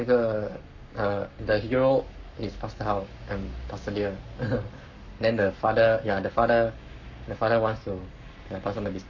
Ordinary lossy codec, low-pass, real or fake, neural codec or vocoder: none; 7.2 kHz; fake; codec, 44.1 kHz, 7.8 kbps, Pupu-Codec